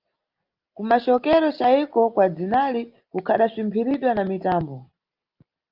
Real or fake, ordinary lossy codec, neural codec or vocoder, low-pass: real; Opus, 24 kbps; none; 5.4 kHz